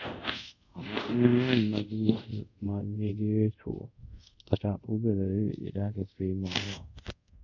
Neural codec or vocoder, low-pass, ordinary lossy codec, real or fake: codec, 24 kHz, 0.5 kbps, DualCodec; 7.2 kHz; none; fake